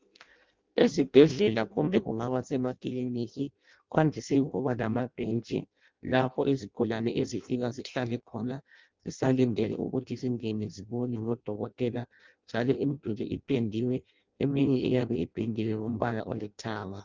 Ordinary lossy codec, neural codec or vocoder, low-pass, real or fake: Opus, 24 kbps; codec, 16 kHz in and 24 kHz out, 0.6 kbps, FireRedTTS-2 codec; 7.2 kHz; fake